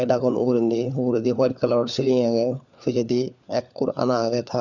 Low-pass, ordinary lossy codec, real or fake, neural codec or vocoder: 7.2 kHz; none; fake; codec, 16 kHz, 4 kbps, FunCodec, trained on LibriTTS, 50 frames a second